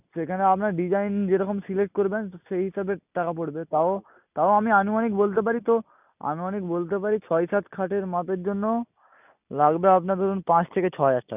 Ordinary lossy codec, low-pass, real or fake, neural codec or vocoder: none; 3.6 kHz; real; none